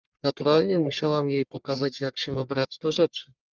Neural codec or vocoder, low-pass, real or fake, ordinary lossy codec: codec, 44.1 kHz, 1.7 kbps, Pupu-Codec; 7.2 kHz; fake; Opus, 24 kbps